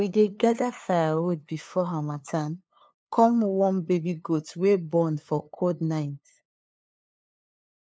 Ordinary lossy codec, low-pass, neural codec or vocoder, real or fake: none; none; codec, 16 kHz, 2 kbps, FunCodec, trained on LibriTTS, 25 frames a second; fake